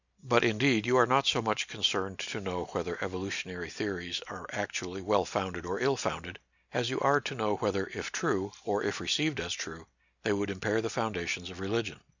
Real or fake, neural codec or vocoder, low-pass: real; none; 7.2 kHz